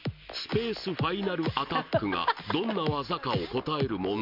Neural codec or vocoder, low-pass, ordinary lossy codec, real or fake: none; 5.4 kHz; MP3, 48 kbps; real